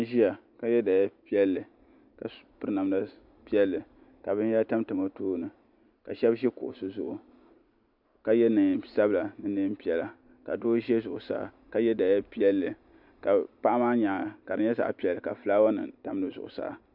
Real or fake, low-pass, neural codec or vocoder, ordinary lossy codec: real; 5.4 kHz; none; MP3, 48 kbps